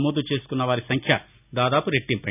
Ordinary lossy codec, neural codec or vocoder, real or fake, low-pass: none; none; real; 3.6 kHz